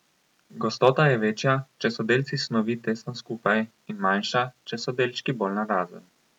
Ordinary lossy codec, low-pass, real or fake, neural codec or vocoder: none; 19.8 kHz; real; none